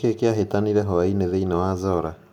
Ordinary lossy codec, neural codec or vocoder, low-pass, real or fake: none; none; 19.8 kHz; real